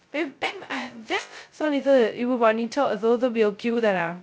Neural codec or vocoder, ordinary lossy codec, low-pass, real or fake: codec, 16 kHz, 0.2 kbps, FocalCodec; none; none; fake